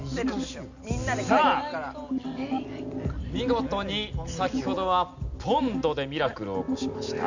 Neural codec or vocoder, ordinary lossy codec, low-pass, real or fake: none; none; 7.2 kHz; real